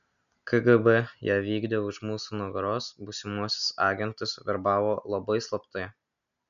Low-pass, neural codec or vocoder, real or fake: 7.2 kHz; none; real